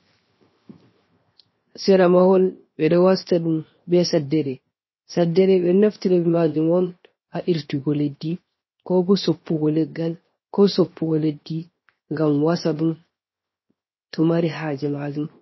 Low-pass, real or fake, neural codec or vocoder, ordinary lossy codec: 7.2 kHz; fake; codec, 16 kHz, 0.7 kbps, FocalCodec; MP3, 24 kbps